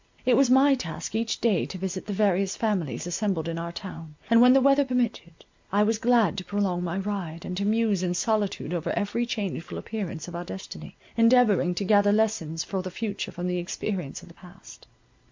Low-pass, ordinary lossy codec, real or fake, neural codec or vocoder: 7.2 kHz; MP3, 64 kbps; real; none